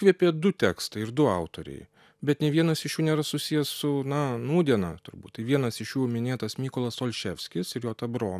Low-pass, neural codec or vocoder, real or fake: 14.4 kHz; none; real